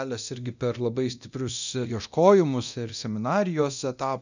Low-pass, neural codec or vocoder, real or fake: 7.2 kHz; codec, 24 kHz, 0.9 kbps, DualCodec; fake